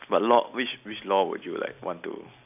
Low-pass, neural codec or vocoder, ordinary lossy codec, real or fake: 3.6 kHz; none; none; real